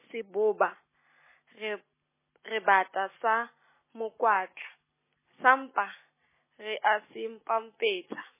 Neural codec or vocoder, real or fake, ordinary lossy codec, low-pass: none; real; MP3, 16 kbps; 3.6 kHz